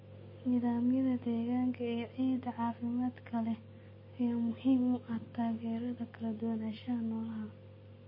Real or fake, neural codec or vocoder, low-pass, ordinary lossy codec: real; none; 5.4 kHz; MP3, 24 kbps